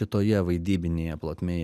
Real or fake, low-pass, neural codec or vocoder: real; 14.4 kHz; none